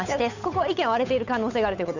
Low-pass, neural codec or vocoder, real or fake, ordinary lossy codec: 7.2 kHz; codec, 16 kHz, 8 kbps, FunCodec, trained on Chinese and English, 25 frames a second; fake; none